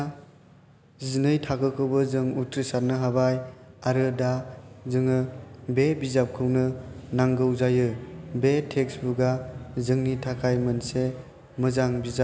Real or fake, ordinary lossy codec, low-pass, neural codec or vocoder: real; none; none; none